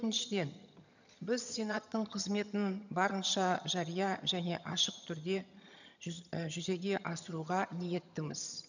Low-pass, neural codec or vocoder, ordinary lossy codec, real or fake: 7.2 kHz; vocoder, 22.05 kHz, 80 mel bands, HiFi-GAN; none; fake